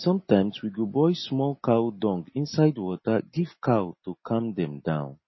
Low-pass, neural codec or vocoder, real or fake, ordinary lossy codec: 7.2 kHz; none; real; MP3, 24 kbps